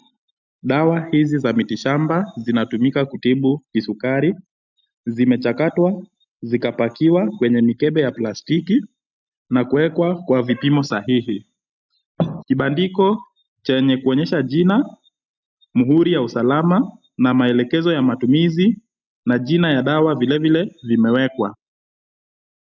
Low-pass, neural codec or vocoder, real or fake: 7.2 kHz; none; real